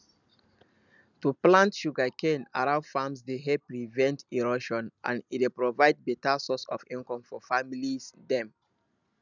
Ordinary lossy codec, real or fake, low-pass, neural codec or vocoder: none; real; 7.2 kHz; none